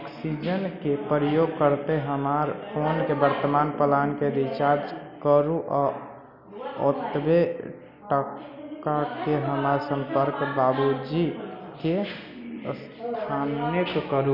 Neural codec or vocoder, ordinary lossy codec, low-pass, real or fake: none; none; 5.4 kHz; real